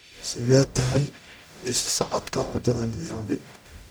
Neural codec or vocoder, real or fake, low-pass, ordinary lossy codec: codec, 44.1 kHz, 0.9 kbps, DAC; fake; none; none